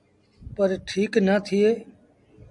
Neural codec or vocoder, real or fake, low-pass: none; real; 10.8 kHz